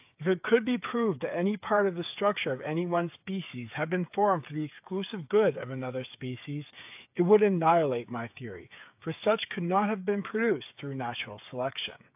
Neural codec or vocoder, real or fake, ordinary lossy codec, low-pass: codec, 16 kHz, 8 kbps, FreqCodec, smaller model; fake; AAC, 32 kbps; 3.6 kHz